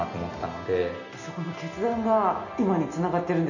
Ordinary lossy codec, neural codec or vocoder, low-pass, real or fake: none; none; 7.2 kHz; real